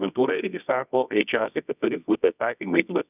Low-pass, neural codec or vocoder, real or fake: 3.6 kHz; codec, 24 kHz, 0.9 kbps, WavTokenizer, medium music audio release; fake